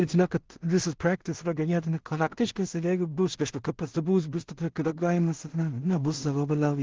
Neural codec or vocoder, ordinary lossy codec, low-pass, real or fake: codec, 16 kHz in and 24 kHz out, 0.4 kbps, LongCat-Audio-Codec, two codebook decoder; Opus, 16 kbps; 7.2 kHz; fake